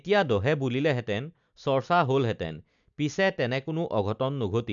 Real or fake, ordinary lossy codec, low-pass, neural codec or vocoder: real; none; 7.2 kHz; none